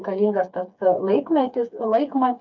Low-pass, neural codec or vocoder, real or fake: 7.2 kHz; codec, 16 kHz, 4 kbps, FreqCodec, smaller model; fake